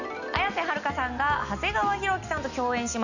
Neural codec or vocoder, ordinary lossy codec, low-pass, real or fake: none; none; 7.2 kHz; real